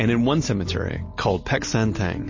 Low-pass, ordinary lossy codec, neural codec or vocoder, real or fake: 7.2 kHz; MP3, 32 kbps; none; real